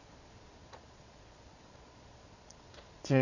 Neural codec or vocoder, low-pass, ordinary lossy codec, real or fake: vocoder, 22.05 kHz, 80 mel bands, Vocos; 7.2 kHz; none; fake